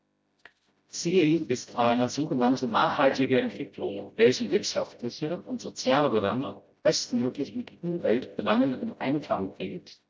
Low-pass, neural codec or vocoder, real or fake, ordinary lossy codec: none; codec, 16 kHz, 0.5 kbps, FreqCodec, smaller model; fake; none